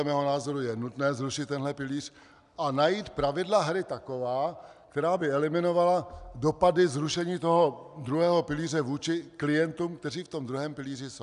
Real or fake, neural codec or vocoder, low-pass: real; none; 10.8 kHz